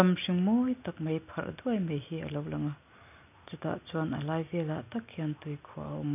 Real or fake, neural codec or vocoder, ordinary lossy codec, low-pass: real; none; none; 3.6 kHz